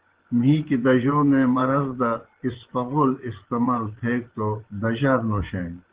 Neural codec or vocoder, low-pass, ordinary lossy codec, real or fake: vocoder, 24 kHz, 100 mel bands, Vocos; 3.6 kHz; Opus, 16 kbps; fake